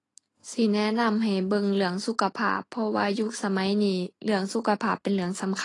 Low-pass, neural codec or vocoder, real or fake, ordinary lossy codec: 10.8 kHz; none; real; AAC, 32 kbps